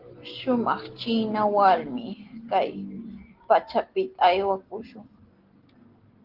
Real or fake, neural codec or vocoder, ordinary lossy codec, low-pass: real; none; Opus, 16 kbps; 5.4 kHz